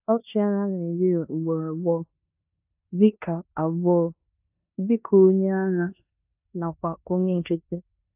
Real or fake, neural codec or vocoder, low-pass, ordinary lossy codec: fake; codec, 16 kHz in and 24 kHz out, 0.9 kbps, LongCat-Audio-Codec, four codebook decoder; 3.6 kHz; AAC, 32 kbps